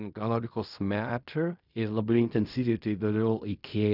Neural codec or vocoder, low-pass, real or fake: codec, 16 kHz in and 24 kHz out, 0.4 kbps, LongCat-Audio-Codec, fine tuned four codebook decoder; 5.4 kHz; fake